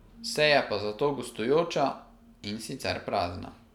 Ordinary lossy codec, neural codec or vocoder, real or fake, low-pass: none; none; real; 19.8 kHz